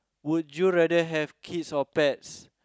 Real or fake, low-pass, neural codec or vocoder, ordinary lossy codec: real; none; none; none